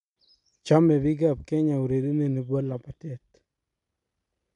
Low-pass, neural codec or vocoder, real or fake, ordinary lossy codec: 10.8 kHz; none; real; none